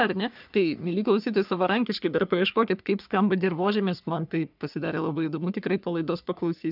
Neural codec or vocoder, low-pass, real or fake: codec, 44.1 kHz, 3.4 kbps, Pupu-Codec; 5.4 kHz; fake